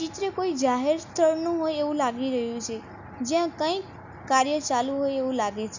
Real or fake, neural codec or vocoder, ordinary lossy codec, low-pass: real; none; Opus, 64 kbps; 7.2 kHz